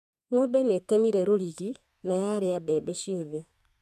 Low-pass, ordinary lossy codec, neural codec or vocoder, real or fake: 14.4 kHz; none; codec, 32 kHz, 1.9 kbps, SNAC; fake